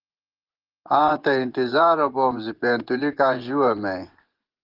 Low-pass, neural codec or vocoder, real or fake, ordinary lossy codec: 5.4 kHz; vocoder, 24 kHz, 100 mel bands, Vocos; fake; Opus, 16 kbps